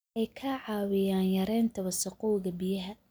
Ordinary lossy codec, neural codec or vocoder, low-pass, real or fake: none; none; none; real